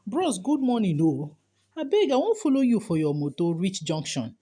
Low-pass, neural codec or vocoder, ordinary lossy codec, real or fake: 9.9 kHz; none; none; real